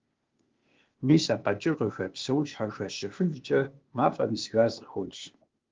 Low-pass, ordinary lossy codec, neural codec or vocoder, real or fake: 7.2 kHz; Opus, 32 kbps; codec, 16 kHz, 0.8 kbps, ZipCodec; fake